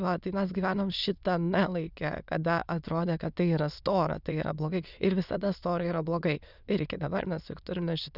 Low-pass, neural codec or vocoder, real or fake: 5.4 kHz; autoencoder, 22.05 kHz, a latent of 192 numbers a frame, VITS, trained on many speakers; fake